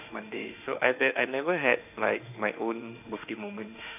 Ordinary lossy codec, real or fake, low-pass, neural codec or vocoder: none; fake; 3.6 kHz; autoencoder, 48 kHz, 32 numbers a frame, DAC-VAE, trained on Japanese speech